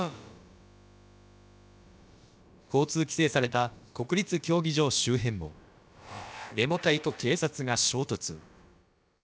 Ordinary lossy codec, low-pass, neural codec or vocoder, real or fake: none; none; codec, 16 kHz, about 1 kbps, DyCAST, with the encoder's durations; fake